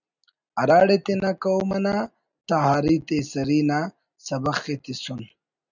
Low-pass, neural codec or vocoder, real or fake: 7.2 kHz; none; real